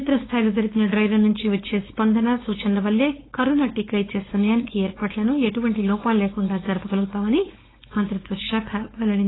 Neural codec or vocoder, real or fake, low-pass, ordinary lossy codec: codec, 16 kHz, 4.8 kbps, FACodec; fake; 7.2 kHz; AAC, 16 kbps